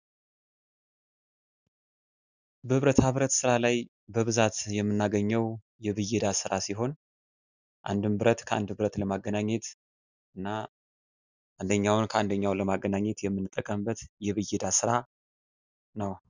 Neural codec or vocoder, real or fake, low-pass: none; real; 7.2 kHz